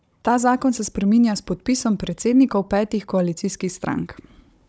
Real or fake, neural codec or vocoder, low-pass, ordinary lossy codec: fake; codec, 16 kHz, 16 kbps, FunCodec, trained on Chinese and English, 50 frames a second; none; none